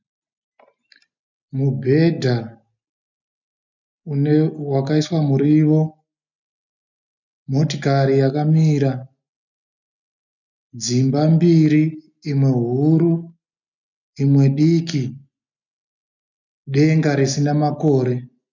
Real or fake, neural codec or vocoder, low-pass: real; none; 7.2 kHz